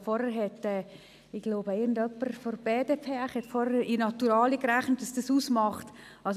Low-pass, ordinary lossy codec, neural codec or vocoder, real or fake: 14.4 kHz; none; none; real